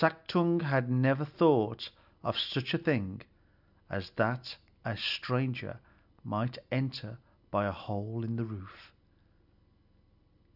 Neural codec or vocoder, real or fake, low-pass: none; real; 5.4 kHz